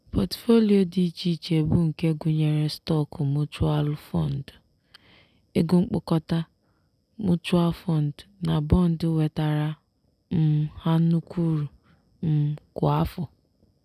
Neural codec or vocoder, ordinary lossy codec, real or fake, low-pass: none; none; real; 14.4 kHz